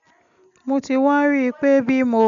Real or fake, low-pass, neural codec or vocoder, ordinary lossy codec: real; 7.2 kHz; none; none